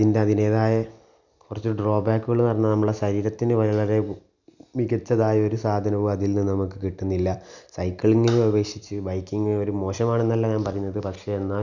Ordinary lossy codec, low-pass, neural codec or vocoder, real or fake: none; 7.2 kHz; none; real